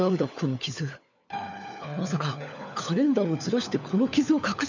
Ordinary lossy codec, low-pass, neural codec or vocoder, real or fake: none; 7.2 kHz; codec, 16 kHz, 4 kbps, FunCodec, trained on LibriTTS, 50 frames a second; fake